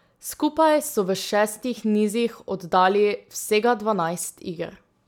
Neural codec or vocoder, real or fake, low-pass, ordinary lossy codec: none; real; 19.8 kHz; none